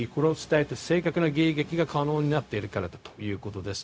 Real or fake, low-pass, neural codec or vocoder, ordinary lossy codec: fake; none; codec, 16 kHz, 0.4 kbps, LongCat-Audio-Codec; none